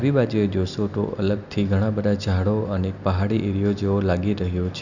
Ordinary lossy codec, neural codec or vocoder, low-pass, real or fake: none; none; 7.2 kHz; real